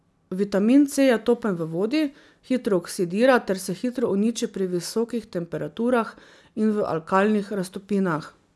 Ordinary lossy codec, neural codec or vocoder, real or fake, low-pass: none; none; real; none